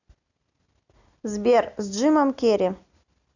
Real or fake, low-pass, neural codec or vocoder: real; 7.2 kHz; none